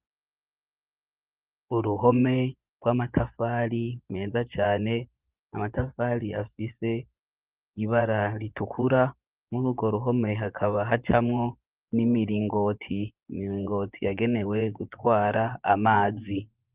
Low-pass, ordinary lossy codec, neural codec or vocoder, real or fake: 3.6 kHz; Opus, 32 kbps; vocoder, 24 kHz, 100 mel bands, Vocos; fake